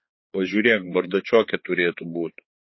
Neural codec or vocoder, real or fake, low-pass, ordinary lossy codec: codec, 16 kHz, 4 kbps, X-Codec, HuBERT features, trained on balanced general audio; fake; 7.2 kHz; MP3, 24 kbps